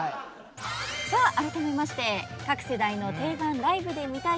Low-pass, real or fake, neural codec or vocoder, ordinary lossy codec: none; real; none; none